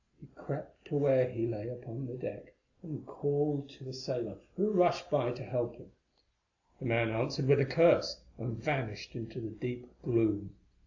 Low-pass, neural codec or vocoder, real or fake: 7.2 kHz; none; real